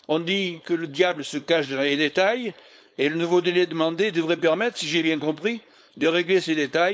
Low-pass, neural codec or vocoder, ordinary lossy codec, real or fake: none; codec, 16 kHz, 4.8 kbps, FACodec; none; fake